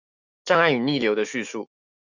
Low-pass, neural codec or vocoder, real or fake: 7.2 kHz; autoencoder, 48 kHz, 128 numbers a frame, DAC-VAE, trained on Japanese speech; fake